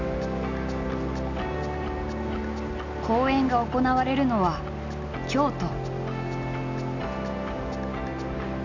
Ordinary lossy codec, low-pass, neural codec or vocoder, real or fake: none; 7.2 kHz; none; real